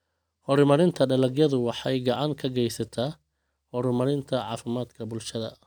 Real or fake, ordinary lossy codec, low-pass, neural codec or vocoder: real; none; none; none